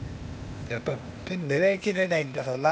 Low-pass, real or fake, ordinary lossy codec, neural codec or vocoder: none; fake; none; codec, 16 kHz, 0.8 kbps, ZipCodec